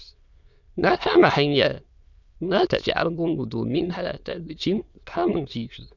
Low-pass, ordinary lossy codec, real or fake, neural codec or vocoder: 7.2 kHz; none; fake; autoencoder, 22.05 kHz, a latent of 192 numbers a frame, VITS, trained on many speakers